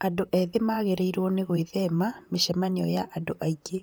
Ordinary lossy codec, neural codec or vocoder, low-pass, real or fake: none; vocoder, 44.1 kHz, 128 mel bands, Pupu-Vocoder; none; fake